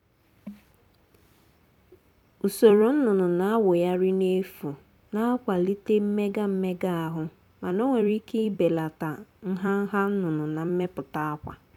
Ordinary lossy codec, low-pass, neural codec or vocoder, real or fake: none; 19.8 kHz; vocoder, 44.1 kHz, 128 mel bands every 256 samples, BigVGAN v2; fake